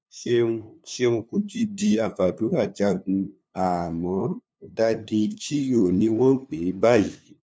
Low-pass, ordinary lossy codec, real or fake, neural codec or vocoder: none; none; fake; codec, 16 kHz, 2 kbps, FunCodec, trained on LibriTTS, 25 frames a second